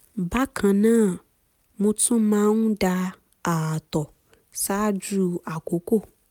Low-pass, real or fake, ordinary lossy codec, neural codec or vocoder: 19.8 kHz; real; none; none